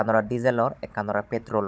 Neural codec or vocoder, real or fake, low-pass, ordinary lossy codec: codec, 16 kHz, 16 kbps, FunCodec, trained on Chinese and English, 50 frames a second; fake; none; none